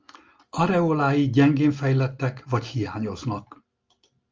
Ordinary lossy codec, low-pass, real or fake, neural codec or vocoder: Opus, 24 kbps; 7.2 kHz; real; none